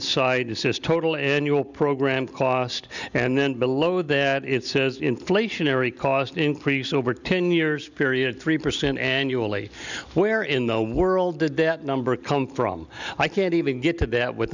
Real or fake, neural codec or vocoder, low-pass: real; none; 7.2 kHz